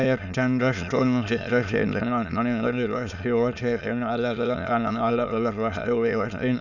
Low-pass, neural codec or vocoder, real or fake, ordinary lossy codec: 7.2 kHz; autoencoder, 22.05 kHz, a latent of 192 numbers a frame, VITS, trained on many speakers; fake; none